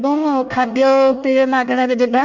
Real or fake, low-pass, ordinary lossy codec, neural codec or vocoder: fake; 7.2 kHz; none; codec, 24 kHz, 1 kbps, SNAC